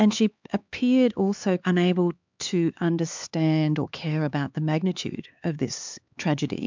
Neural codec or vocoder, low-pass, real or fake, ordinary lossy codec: codec, 16 kHz, 4 kbps, X-Codec, HuBERT features, trained on LibriSpeech; 7.2 kHz; fake; MP3, 64 kbps